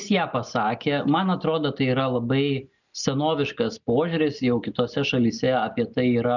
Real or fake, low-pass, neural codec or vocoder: real; 7.2 kHz; none